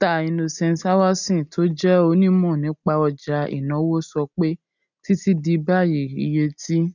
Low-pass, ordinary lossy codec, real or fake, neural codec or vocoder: 7.2 kHz; none; real; none